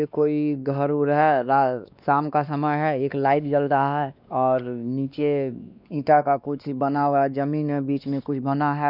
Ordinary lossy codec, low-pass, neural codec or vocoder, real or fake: none; 5.4 kHz; codec, 16 kHz, 2 kbps, X-Codec, WavLM features, trained on Multilingual LibriSpeech; fake